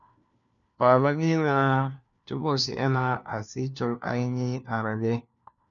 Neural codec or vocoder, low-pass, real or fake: codec, 16 kHz, 1 kbps, FunCodec, trained on LibriTTS, 50 frames a second; 7.2 kHz; fake